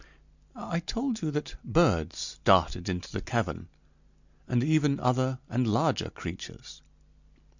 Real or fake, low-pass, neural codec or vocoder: real; 7.2 kHz; none